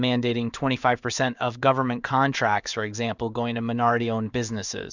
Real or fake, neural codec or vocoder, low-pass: fake; codec, 16 kHz in and 24 kHz out, 1 kbps, XY-Tokenizer; 7.2 kHz